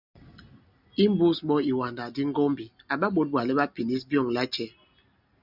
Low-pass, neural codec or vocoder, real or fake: 5.4 kHz; none; real